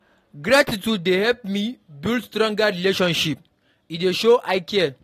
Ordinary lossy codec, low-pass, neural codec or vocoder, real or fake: AAC, 48 kbps; 14.4 kHz; none; real